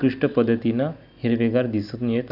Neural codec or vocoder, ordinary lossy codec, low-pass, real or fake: none; none; 5.4 kHz; real